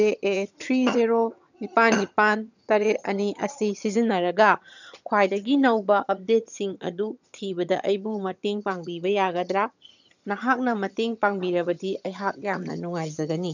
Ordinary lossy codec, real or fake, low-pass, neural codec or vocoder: none; fake; 7.2 kHz; vocoder, 22.05 kHz, 80 mel bands, HiFi-GAN